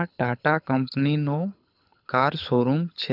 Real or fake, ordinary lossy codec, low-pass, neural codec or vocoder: fake; none; 5.4 kHz; codec, 24 kHz, 6 kbps, HILCodec